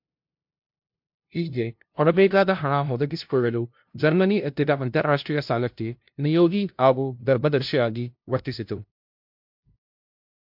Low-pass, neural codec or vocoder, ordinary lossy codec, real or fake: 5.4 kHz; codec, 16 kHz, 0.5 kbps, FunCodec, trained on LibriTTS, 25 frames a second; MP3, 48 kbps; fake